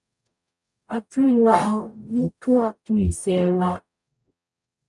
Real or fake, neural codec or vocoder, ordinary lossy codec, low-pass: fake; codec, 44.1 kHz, 0.9 kbps, DAC; MP3, 96 kbps; 10.8 kHz